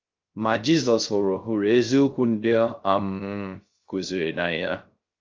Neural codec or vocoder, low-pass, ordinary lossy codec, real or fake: codec, 16 kHz, 0.3 kbps, FocalCodec; 7.2 kHz; Opus, 32 kbps; fake